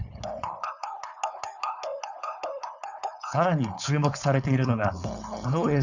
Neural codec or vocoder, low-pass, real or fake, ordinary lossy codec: codec, 16 kHz, 4.8 kbps, FACodec; 7.2 kHz; fake; none